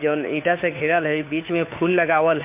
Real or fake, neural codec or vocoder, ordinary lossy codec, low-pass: fake; codec, 16 kHz, 4 kbps, FunCodec, trained on LibriTTS, 50 frames a second; MP3, 24 kbps; 3.6 kHz